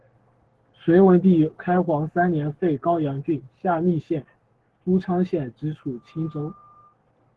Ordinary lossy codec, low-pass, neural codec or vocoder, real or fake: Opus, 16 kbps; 10.8 kHz; codec, 44.1 kHz, 7.8 kbps, Pupu-Codec; fake